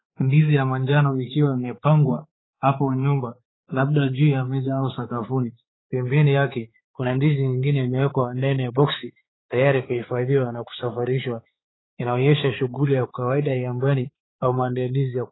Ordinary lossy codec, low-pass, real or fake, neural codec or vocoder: AAC, 16 kbps; 7.2 kHz; fake; codec, 16 kHz, 4 kbps, X-Codec, HuBERT features, trained on balanced general audio